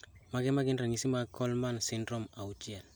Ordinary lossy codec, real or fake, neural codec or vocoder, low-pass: none; real; none; none